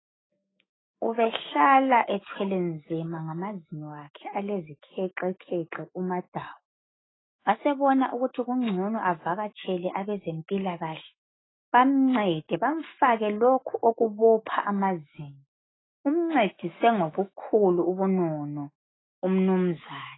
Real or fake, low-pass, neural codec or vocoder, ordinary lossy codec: fake; 7.2 kHz; autoencoder, 48 kHz, 128 numbers a frame, DAC-VAE, trained on Japanese speech; AAC, 16 kbps